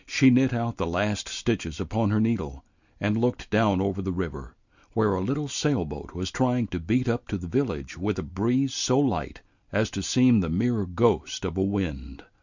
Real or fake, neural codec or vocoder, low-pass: real; none; 7.2 kHz